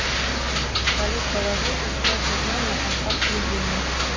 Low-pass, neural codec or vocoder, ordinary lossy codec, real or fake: 7.2 kHz; none; MP3, 32 kbps; real